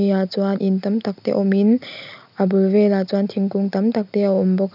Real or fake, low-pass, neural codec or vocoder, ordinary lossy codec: real; 5.4 kHz; none; none